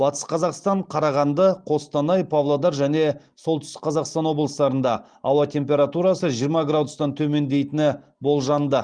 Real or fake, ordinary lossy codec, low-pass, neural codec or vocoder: real; Opus, 24 kbps; 9.9 kHz; none